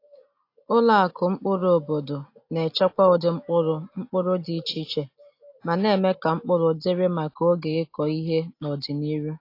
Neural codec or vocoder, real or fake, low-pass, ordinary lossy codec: none; real; 5.4 kHz; AAC, 32 kbps